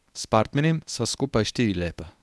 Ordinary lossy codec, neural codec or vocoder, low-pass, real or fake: none; codec, 24 kHz, 0.9 kbps, WavTokenizer, medium speech release version 1; none; fake